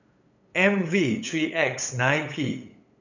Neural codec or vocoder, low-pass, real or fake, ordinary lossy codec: codec, 16 kHz, 8 kbps, FunCodec, trained on LibriTTS, 25 frames a second; 7.2 kHz; fake; none